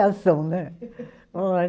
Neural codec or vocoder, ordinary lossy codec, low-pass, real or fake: none; none; none; real